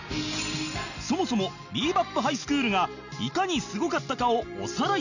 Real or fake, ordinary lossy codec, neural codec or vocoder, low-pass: real; none; none; 7.2 kHz